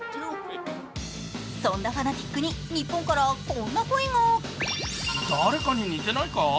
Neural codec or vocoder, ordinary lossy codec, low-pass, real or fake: none; none; none; real